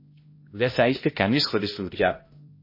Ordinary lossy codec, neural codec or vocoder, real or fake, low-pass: MP3, 24 kbps; codec, 16 kHz, 0.5 kbps, X-Codec, HuBERT features, trained on balanced general audio; fake; 5.4 kHz